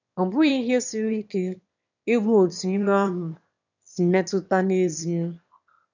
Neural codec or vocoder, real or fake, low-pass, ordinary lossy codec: autoencoder, 22.05 kHz, a latent of 192 numbers a frame, VITS, trained on one speaker; fake; 7.2 kHz; none